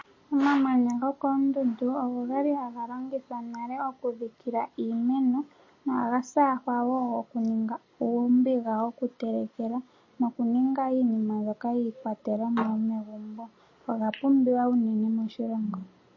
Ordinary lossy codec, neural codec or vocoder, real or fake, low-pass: MP3, 32 kbps; none; real; 7.2 kHz